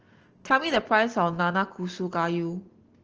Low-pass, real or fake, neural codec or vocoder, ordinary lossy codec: 7.2 kHz; real; none; Opus, 16 kbps